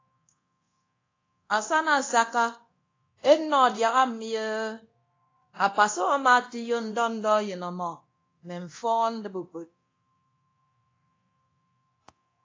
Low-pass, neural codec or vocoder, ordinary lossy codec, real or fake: 7.2 kHz; codec, 24 kHz, 0.9 kbps, DualCodec; AAC, 32 kbps; fake